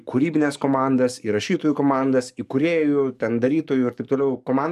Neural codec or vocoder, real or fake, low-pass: codec, 44.1 kHz, 7.8 kbps, DAC; fake; 14.4 kHz